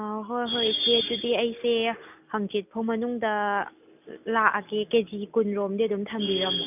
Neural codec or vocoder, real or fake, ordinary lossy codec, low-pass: none; real; none; 3.6 kHz